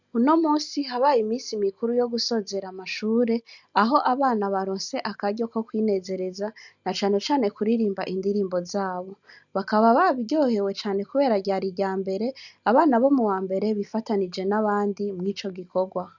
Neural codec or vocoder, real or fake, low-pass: none; real; 7.2 kHz